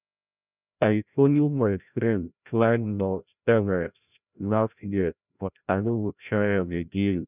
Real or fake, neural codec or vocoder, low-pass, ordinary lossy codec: fake; codec, 16 kHz, 0.5 kbps, FreqCodec, larger model; 3.6 kHz; none